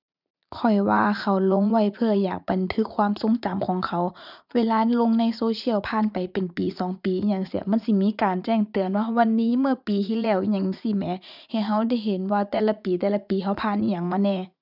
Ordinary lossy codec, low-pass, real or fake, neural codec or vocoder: none; 5.4 kHz; fake; vocoder, 44.1 kHz, 80 mel bands, Vocos